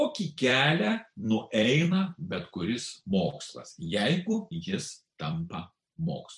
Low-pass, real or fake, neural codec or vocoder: 10.8 kHz; fake; vocoder, 44.1 kHz, 128 mel bands every 256 samples, BigVGAN v2